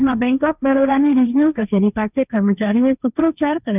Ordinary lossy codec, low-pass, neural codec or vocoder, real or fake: none; 3.6 kHz; codec, 16 kHz, 1.1 kbps, Voila-Tokenizer; fake